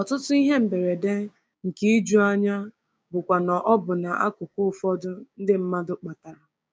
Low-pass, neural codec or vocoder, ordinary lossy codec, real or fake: none; codec, 16 kHz, 6 kbps, DAC; none; fake